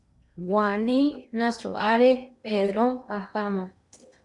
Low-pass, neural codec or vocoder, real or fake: 10.8 kHz; codec, 16 kHz in and 24 kHz out, 0.8 kbps, FocalCodec, streaming, 65536 codes; fake